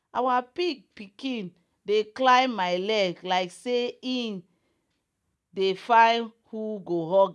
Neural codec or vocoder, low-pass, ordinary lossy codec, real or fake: none; none; none; real